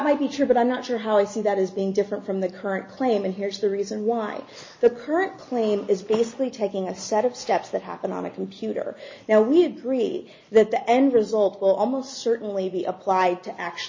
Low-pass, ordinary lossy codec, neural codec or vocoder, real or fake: 7.2 kHz; MP3, 32 kbps; none; real